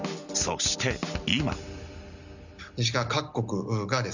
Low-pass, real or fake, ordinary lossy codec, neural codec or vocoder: 7.2 kHz; real; none; none